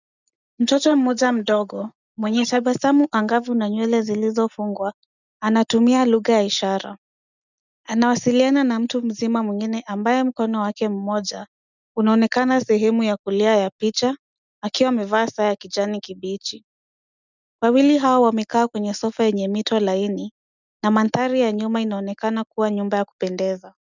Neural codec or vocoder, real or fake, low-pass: none; real; 7.2 kHz